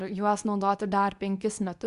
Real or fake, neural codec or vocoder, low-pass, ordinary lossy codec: fake; codec, 24 kHz, 0.9 kbps, WavTokenizer, medium speech release version 2; 10.8 kHz; Opus, 64 kbps